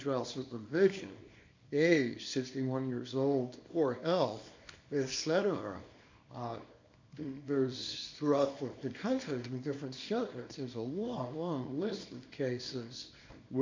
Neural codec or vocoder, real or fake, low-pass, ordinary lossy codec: codec, 24 kHz, 0.9 kbps, WavTokenizer, small release; fake; 7.2 kHz; MP3, 48 kbps